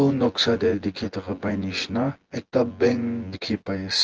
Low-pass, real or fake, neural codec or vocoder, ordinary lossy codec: 7.2 kHz; fake; vocoder, 24 kHz, 100 mel bands, Vocos; Opus, 16 kbps